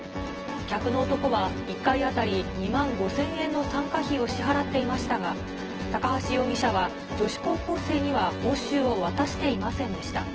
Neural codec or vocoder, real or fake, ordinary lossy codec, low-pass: vocoder, 24 kHz, 100 mel bands, Vocos; fake; Opus, 16 kbps; 7.2 kHz